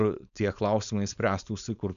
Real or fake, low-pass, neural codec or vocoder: fake; 7.2 kHz; codec, 16 kHz, 4.8 kbps, FACodec